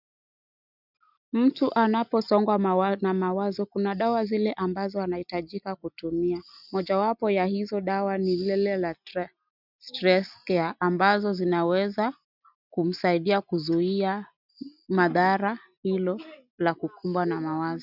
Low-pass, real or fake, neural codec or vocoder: 5.4 kHz; real; none